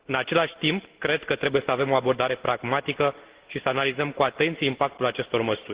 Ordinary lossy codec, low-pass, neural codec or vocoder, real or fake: Opus, 16 kbps; 3.6 kHz; none; real